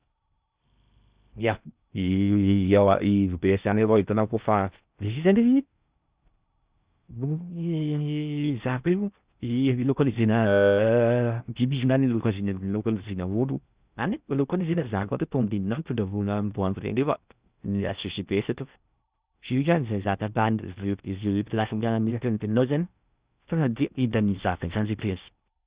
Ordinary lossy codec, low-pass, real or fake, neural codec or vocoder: Opus, 64 kbps; 3.6 kHz; fake; codec, 16 kHz in and 24 kHz out, 0.8 kbps, FocalCodec, streaming, 65536 codes